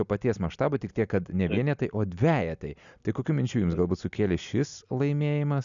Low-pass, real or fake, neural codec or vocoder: 7.2 kHz; real; none